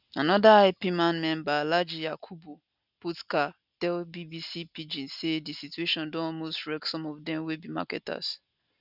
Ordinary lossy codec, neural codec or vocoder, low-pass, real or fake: none; none; 5.4 kHz; real